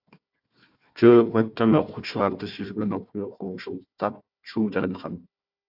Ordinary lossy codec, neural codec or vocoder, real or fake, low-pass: AAC, 48 kbps; codec, 16 kHz, 1 kbps, FunCodec, trained on Chinese and English, 50 frames a second; fake; 5.4 kHz